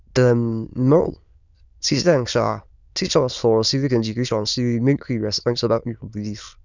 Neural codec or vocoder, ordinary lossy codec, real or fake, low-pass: autoencoder, 22.05 kHz, a latent of 192 numbers a frame, VITS, trained on many speakers; none; fake; 7.2 kHz